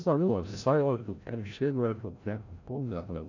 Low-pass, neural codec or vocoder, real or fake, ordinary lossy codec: 7.2 kHz; codec, 16 kHz, 0.5 kbps, FreqCodec, larger model; fake; AAC, 48 kbps